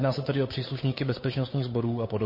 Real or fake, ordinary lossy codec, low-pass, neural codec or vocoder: fake; MP3, 24 kbps; 5.4 kHz; codec, 16 kHz, 8 kbps, FunCodec, trained on Chinese and English, 25 frames a second